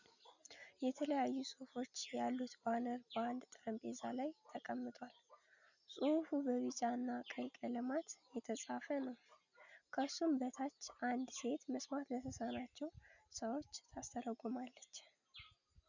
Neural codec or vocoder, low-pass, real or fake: none; 7.2 kHz; real